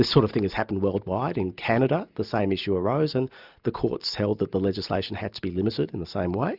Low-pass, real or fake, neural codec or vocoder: 5.4 kHz; real; none